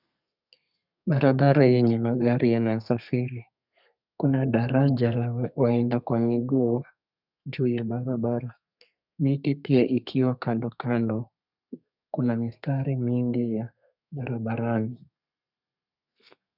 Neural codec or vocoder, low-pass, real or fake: codec, 32 kHz, 1.9 kbps, SNAC; 5.4 kHz; fake